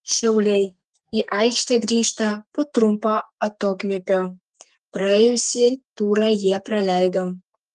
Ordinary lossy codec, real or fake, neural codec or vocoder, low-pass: Opus, 32 kbps; fake; codec, 32 kHz, 1.9 kbps, SNAC; 10.8 kHz